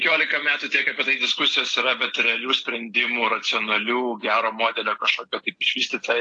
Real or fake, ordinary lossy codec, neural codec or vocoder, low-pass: real; AAC, 48 kbps; none; 9.9 kHz